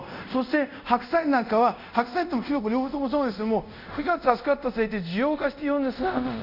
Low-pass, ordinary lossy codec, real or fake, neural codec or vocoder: 5.4 kHz; none; fake; codec, 24 kHz, 0.5 kbps, DualCodec